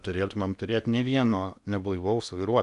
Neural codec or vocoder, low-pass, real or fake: codec, 16 kHz in and 24 kHz out, 0.8 kbps, FocalCodec, streaming, 65536 codes; 10.8 kHz; fake